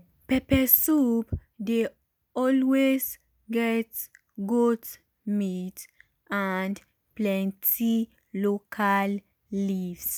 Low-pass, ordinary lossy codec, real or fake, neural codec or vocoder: none; none; real; none